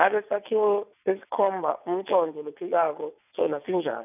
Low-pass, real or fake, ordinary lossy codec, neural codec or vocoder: 3.6 kHz; fake; none; vocoder, 22.05 kHz, 80 mel bands, WaveNeXt